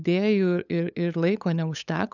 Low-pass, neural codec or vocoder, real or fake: 7.2 kHz; codec, 16 kHz, 8 kbps, FunCodec, trained on LibriTTS, 25 frames a second; fake